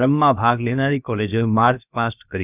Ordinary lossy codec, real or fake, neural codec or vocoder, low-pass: none; fake; codec, 16 kHz, about 1 kbps, DyCAST, with the encoder's durations; 3.6 kHz